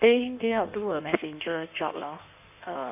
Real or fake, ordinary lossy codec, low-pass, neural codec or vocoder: fake; none; 3.6 kHz; codec, 16 kHz in and 24 kHz out, 1.1 kbps, FireRedTTS-2 codec